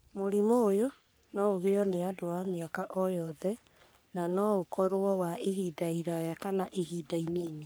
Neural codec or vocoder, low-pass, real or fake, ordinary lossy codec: codec, 44.1 kHz, 3.4 kbps, Pupu-Codec; none; fake; none